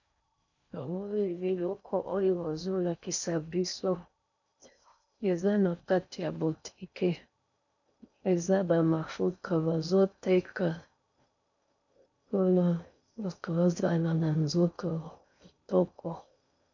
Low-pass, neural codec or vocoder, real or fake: 7.2 kHz; codec, 16 kHz in and 24 kHz out, 0.6 kbps, FocalCodec, streaming, 4096 codes; fake